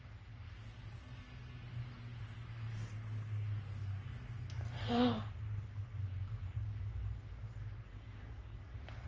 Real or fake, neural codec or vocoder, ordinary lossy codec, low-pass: fake; codec, 44.1 kHz, 3.4 kbps, Pupu-Codec; Opus, 24 kbps; 7.2 kHz